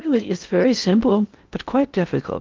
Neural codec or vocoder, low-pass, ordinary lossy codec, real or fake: codec, 16 kHz in and 24 kHz out, 0.6 kbps, FocalCodec, streaming, 4096 codes; 7.2 kHz; Opus, 32 kbps; fake